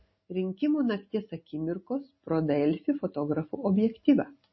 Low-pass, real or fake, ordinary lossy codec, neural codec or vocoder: 7.2 kHz; real; MP3, 24 kbps; none